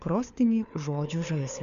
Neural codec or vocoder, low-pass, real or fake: codec, 16 kHz, 4 kbps, FunCodec, trained on LibriTTS, 50 frames a second; 7.2 kHz; fake